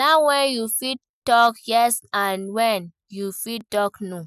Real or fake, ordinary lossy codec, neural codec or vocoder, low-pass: real; none; none; 14.4 kHz